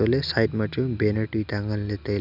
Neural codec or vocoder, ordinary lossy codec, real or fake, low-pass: none; none; real; 5.4 kHz